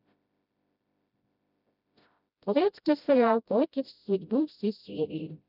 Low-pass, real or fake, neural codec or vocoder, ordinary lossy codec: 5.4 kHz; fake; codec, 16 kHz, 0.5 kbps, FreqCodec, smaller model; none